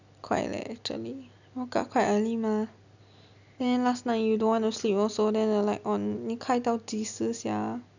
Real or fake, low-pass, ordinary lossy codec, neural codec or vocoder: real; 7.2 kHz; none; none